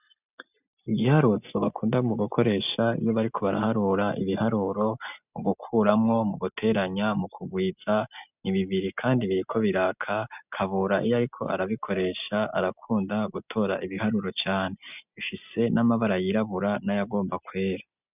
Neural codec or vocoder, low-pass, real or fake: none; 3.6 kHz; real